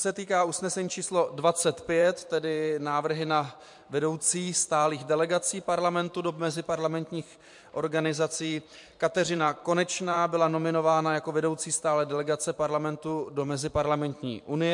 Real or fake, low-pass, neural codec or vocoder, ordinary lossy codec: fake; 10.8 kHz; vocoder, 44.1 kHz, 128 mel bands every 512 samples, BigVGAN v2; MP3, 64 kbps